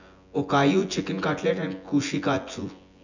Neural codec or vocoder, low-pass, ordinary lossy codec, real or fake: vocoder, 24 kHz, 100 mel bands, Vocos; 7.2 kHz; none; fake